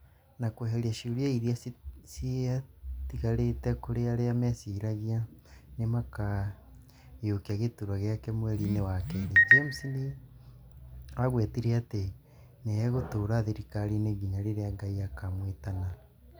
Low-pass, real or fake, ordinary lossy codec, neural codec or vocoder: none; real; none; none